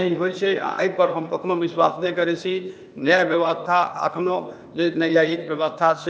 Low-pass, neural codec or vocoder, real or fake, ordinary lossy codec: none; codec, 16 kHz, 0.8 kbps, ZipCodec; fake; none